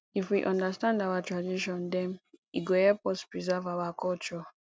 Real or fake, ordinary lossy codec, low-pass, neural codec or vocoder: real; none; none; none